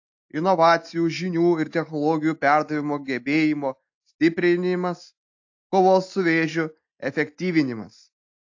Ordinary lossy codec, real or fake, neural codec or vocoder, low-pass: AAC, 48 kbps; real; none; 7.2 kHz